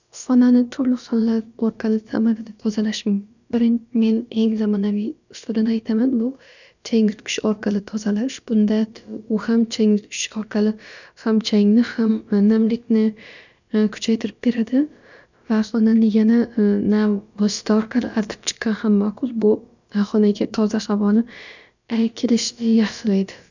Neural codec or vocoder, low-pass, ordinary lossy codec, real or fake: codec, 16 kHz, about 1 kbps, DyCAST, with the encoder's durations; 7.2 kHz; none; fake